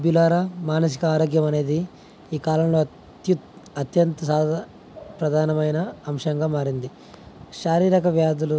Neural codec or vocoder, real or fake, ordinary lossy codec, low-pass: none; real; none; none